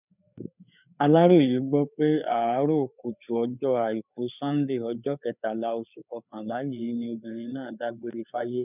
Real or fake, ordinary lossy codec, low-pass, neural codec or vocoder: fake; none; 3.6 kHz; codec, 16 kHz, 4 kbps, FreqCodec, larger model